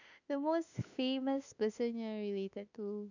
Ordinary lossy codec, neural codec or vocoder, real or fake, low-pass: none; autoencoder, 48 kHz, 32 numbers a frame, DAC-VAE, trained on Japanese speech; fake; 7.2 kHz